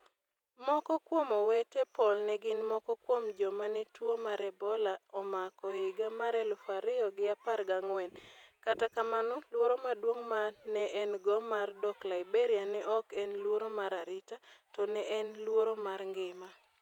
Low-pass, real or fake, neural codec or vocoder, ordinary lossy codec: 19.8 kHz; fake; vocoder, 48 kHz, 128 mel bands, Vocos; none